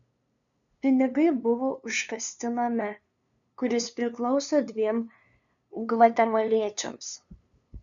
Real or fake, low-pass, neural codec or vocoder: fake; 7.2 kHz; codec, 16 kHz, 2 kbps, FunCodec, trained on LibriTTS, 25 frames a second